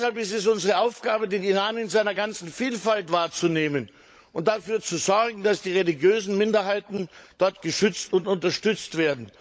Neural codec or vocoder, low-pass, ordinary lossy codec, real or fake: codec, 16 kHz, 16 kbps, FunCodec, trained on LibriTTS, 50 frames a second; none; none; fake